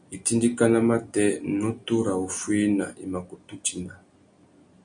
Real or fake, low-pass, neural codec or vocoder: real; 9.9 kHz; none